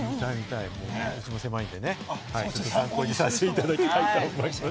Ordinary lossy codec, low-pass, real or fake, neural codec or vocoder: none; none; real; none